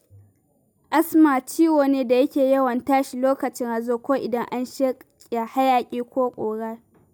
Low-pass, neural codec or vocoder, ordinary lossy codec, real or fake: none; none; none; real